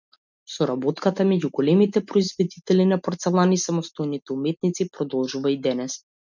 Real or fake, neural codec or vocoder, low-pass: real; none; 7.2 kHz